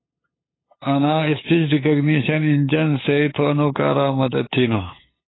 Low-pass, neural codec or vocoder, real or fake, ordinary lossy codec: 7.2 kHz; codec, 16 kHz, 8 kbps, FunCodec, trained on LibriTTS, 25 frames a second; fake; AAC, 16 kbps